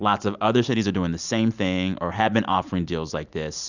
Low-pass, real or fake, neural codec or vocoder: 7.2 kHz; real; none